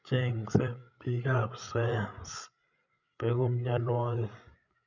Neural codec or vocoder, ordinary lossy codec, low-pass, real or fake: codec, 16 kHz, 8 kbps, FreqCodec, larger model; none; 7.2 kHz; fake